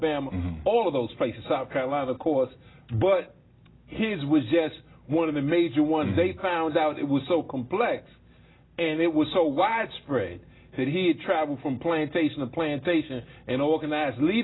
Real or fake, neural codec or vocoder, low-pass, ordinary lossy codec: real; none; 7.2 kHz; AAC, 16 kbps